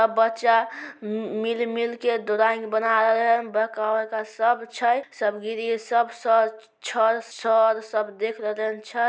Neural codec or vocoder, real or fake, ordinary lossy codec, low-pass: none; real; none; none